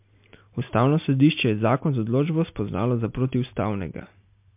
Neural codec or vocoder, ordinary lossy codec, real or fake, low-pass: none; MP3, 32 kbps; real; 3.6 kHz